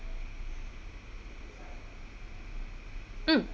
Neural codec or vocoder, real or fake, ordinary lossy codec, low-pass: none; real; none; none